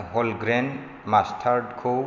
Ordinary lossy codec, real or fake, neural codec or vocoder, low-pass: none; real; none; 7.2 kHz